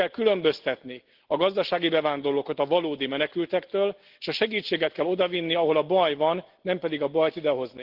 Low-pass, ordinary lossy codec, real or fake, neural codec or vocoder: 5.4 kHz; Opus, 16 kbps; real; none